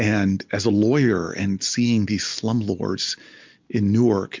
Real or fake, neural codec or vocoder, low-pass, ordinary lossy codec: real; none; 7.2 kHz; MP3, 64 kbps